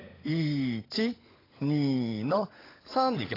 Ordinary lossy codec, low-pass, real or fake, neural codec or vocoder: AAC, 24 kbps; 5.4 kHz; fake; codec, 16 kHz, 16 kbps, FunCodec, trained on LibriTTS, 50 frames a second